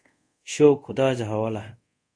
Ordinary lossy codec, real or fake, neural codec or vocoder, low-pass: MP3, 96 kbps; fake; codec, 24 kHz, 0.5 kbps, DualCodec; 9.9 kHz